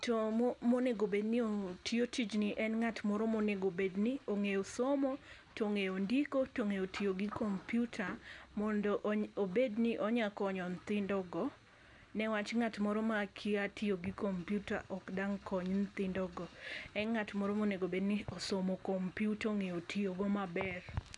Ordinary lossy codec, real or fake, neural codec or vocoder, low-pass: none; real; none; 10.8 kHz